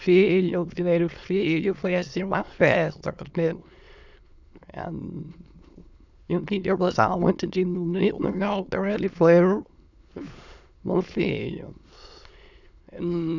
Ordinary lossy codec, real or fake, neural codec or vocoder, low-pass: none; fake; autoencoder, 22.05 kHz, a latent of 192 numbers a frame, VITS, trained on many speakers; 7.2 kHz